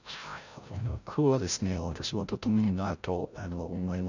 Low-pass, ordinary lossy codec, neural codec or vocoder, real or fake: 7.2 kHz; none; codec, 16 kHz, 0.5 kbps, FreqCodec, larger model; fake